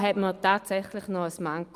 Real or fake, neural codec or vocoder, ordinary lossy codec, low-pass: real; none; Opus, 32 kbps; 14.4 kHz